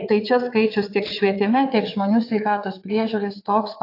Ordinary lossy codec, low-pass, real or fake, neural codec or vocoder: AAC, 32 kbps; 5.4 kHz; fake; vocoder, 22.05 kHz, 80 mel bands, WaveNeXt